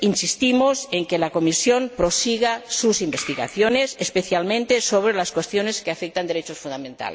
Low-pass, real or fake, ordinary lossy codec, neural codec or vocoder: none; real; none; none